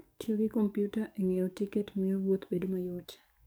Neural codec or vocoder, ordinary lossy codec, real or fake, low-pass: codec, 44.1 kHz, 7.8 kbps, DAC; none; fake; none